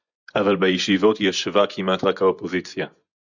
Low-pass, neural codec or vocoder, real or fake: 7.2 kHz; vocoder, 24 kHz, 100 mel bands, Vocos; fake